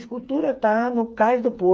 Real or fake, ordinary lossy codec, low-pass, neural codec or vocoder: fake; none; none; codec, 16 kHz, 4 kbps, FreqCodec, smaller model